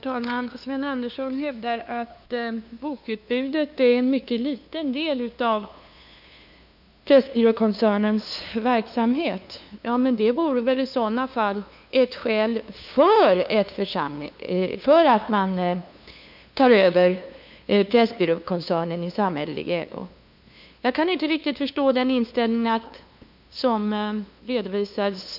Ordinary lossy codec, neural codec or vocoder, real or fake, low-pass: none; codec, 16 kHz, 2 kbps, FunCodec, trained on LibriTTS, 25 frames a second; fake; 5.4 kHz